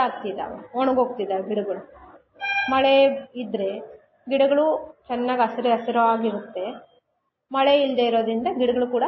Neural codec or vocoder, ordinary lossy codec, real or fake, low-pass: none; MP3, 24 kbps; real; 7.2 kHz